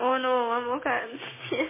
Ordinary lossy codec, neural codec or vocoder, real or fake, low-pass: MP3, 16 kbps; codec, 16 kHz, 6 kbps, DAC; fake; 3.6 kHz